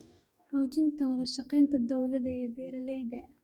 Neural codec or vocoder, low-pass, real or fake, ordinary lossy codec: codec, 44.1 kHz, 2.6 kbps, DAC; 19.8 kHz; fake; none